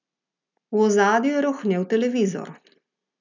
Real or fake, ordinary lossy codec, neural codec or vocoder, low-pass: real; none; none; 7.2 kHz